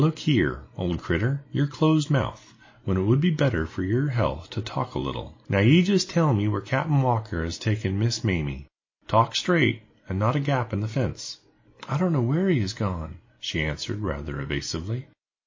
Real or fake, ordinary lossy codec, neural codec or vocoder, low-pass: real; MP3, 32 kbps; none; 7.2 kHz